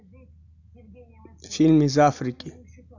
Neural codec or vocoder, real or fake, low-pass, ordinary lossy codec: none; real; 7.2 kHz; none